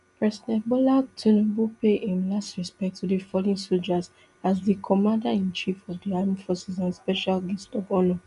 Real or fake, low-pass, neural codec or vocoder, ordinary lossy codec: real; 10.8 kHz; none; none